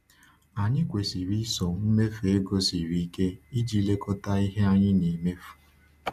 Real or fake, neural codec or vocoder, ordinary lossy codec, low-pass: real; none; none; 14.4 kHz